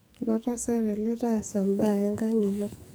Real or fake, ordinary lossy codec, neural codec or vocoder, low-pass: fake; none; codec, 44.1 kHz, 2.6 kbps, SNAC; none